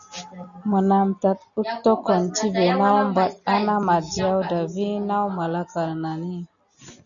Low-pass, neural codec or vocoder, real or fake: 7.2 kHz; none; real